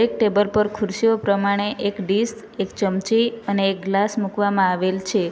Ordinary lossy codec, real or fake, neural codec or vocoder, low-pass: none; real; none; none